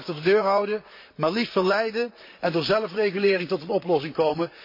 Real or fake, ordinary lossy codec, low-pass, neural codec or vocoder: fake; MP3, 32 kbps; 5.4 kHz; vocoder, 44.1 kHz, 128 mel bands, Pupu-Vocoder